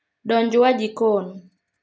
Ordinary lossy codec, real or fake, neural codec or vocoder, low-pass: none; real; none; none